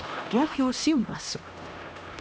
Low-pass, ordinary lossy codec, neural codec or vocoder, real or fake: none; none; codec, 16 kHz, 1 kbps, X-Codec, HuBERT features, trained on LibriSpeech; fake